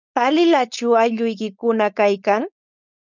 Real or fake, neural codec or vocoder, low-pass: fake; codec, 16 kHz, 4.8 kbps, FACodec; 7.2 kHz